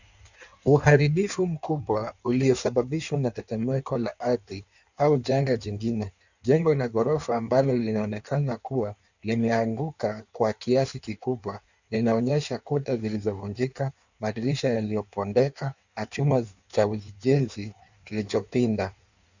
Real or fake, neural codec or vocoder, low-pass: fake; codec, 16 kHz in and 24 kHz out, 1.1 kbps, FireRedTTS-2 codec; 7.2 kHz